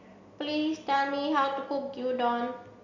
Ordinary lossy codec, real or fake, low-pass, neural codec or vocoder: AAC, 48 kbps; real; 7.2 kHz; none